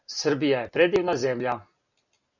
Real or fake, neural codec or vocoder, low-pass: real; none; 7.2 kHz